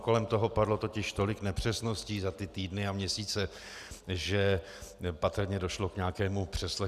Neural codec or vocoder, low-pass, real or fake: none; 14.4 kHz; real